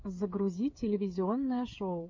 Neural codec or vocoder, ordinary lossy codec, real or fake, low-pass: codec, 16 kHz, 8 kbps, FreqCodec, smaller model; MP3, 48 kbps; fake; 7.2 kHz